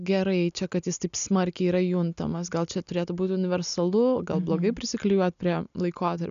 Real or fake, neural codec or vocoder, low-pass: real; none; 7.2 kHz